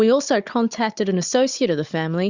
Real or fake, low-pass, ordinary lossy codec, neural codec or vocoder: fake; 7.2 kHz; Opus, 64 kbps; codec, 16 kHz, 16 kbps, FunCodec, trained on Chinese and English, 50 frames a second